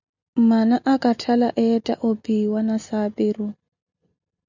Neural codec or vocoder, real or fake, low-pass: none; real; 7.2 kHz